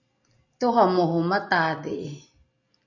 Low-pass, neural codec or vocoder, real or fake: 7.2 kHz; none; real